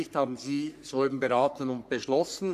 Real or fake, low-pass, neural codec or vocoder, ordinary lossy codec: fake; 14.4 kHz; codec, 44.1 kHz, 3.4 kbps, Pupu-Codec; none